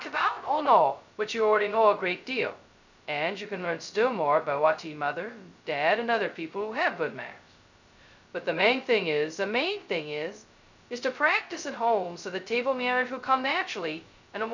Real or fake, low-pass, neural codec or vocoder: fake; 7.2 kHz; codec, 16 kHz, 0.2 kbps, FocalCodec